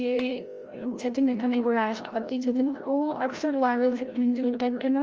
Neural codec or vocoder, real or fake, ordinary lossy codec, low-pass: codec, 16 kHz, 0.5 kbps, FreqCodec, larger model; fake; Opus, 24 kbps; 7.2 kHz